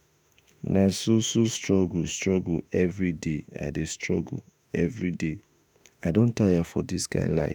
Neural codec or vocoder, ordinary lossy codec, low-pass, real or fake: autoencoder, 48 kHz, 32 numbers a frame, DAC-VAE, trained on Japanese speech; none; none; fake